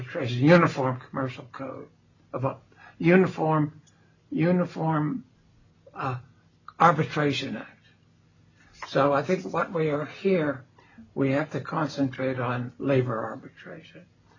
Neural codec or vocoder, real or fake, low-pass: none; real; 7.2 kHz